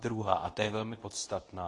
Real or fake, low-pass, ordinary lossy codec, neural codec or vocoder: fake; 10.8 kHz; AAC, 32 kbps; codec, 24 kHz, 0.9 kbps, WavTokenizer, medium speech release version 2